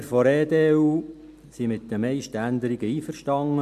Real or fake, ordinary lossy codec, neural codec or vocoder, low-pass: real; none; none; 14.4 kHz